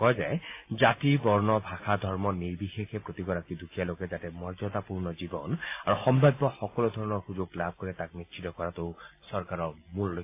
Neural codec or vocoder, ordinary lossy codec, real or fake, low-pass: none; AAC, 24 kbps; real; 3.6 kHz